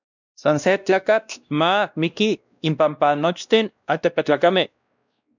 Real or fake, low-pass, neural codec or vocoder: fake; 7.2 kHz; codec, 16 kHz, 1 kbps, X-Codec, WavLM features, trained on Multilingual LibriSpeech